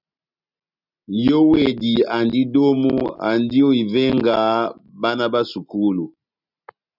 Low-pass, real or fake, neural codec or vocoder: 5.4 kHz; real; none